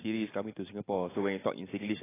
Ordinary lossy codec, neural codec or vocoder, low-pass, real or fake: AAC, 16 kbps; none; 3.6 kHz; real